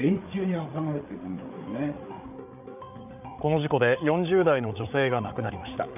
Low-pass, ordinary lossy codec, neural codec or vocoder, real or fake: 3.6 kHz; none; codec, 16 kHz, 8 kbps, FreqCodec, larger model; fake